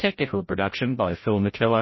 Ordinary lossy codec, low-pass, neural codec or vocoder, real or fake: MP3, 24 kbps; 7.2 kHz; codec, 16 kHz, 0.5 kbps, FreqCodec, larger model; fake